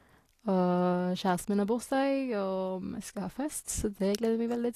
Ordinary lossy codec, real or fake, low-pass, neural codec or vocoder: AAC, 48 kbps; real; 14.4 kHz; none